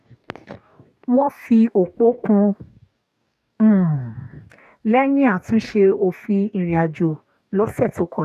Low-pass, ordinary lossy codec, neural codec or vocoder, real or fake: 14.4 kHz; none; codec, 44.1 kHz, 2.6 kbps, DAC; fake